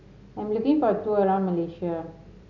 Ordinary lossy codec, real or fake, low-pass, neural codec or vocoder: none; real; 7.2 kHz; none